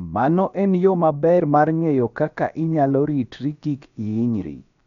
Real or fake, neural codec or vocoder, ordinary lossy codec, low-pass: fake; codec, 16 kHz, about 1 kbps, DyCAST, with the encoder's durations; none; 7.2 kHz